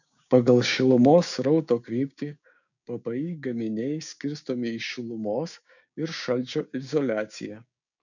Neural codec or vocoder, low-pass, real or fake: codec, 16 kHz, 6 kbps, DAC; 7.2 kHz; fake